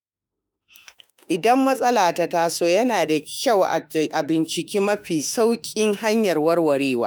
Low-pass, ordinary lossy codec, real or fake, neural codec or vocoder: none; none; fake; autoencoder, 48 kHz, 32 numbers a frame, DAC-VAE, trained on Japanese speech